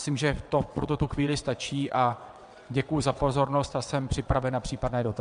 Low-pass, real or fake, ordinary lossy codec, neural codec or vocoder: 9.9 kHz; fake; MP3, 64 kbps; vocoder, 22.05 kHz, 80 mel bands, WaveNeXt